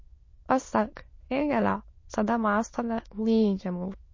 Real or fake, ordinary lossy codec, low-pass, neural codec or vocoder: fake; MP3, 32 kbps; 7.2 kHz; autoencoder, 22.05 kHz, a latent of 192 numbers a frame, VITS, trained on many speakers